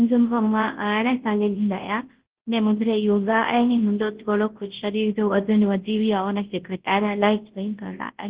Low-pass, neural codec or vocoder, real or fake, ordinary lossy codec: 3.6 kHz; codec, 24 kHz, 0.9 kbps, WavTokenizer, large speech release; fake; Opus, 16 kbps